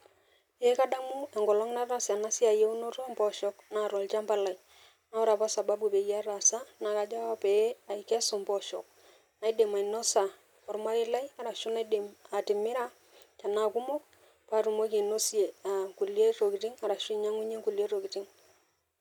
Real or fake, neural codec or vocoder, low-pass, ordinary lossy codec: real; none; 19.8 kHz; none